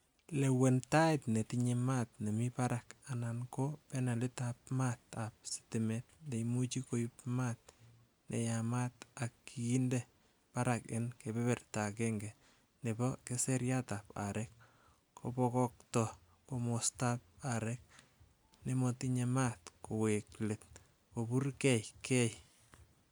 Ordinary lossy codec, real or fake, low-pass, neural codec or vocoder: none; real; none; none